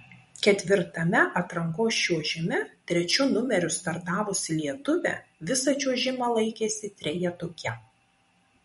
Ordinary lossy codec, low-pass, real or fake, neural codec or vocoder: MP3, 48 kbps; 19.8 kHz; fake; vocoder, 44.1 kHz, 128 mel bands every 256 samples, BigVGAN v2